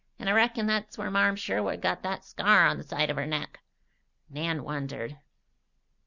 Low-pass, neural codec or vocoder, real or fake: 7.2 kHz; none; real